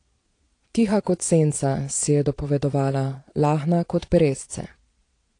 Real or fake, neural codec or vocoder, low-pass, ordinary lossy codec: fake; vocoder, 22.05 kHz, 80 mel bands, Vocos; 9.9 kHz; AAC, 48 kbps